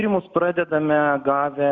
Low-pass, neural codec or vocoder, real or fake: 7.2 kHz; none; real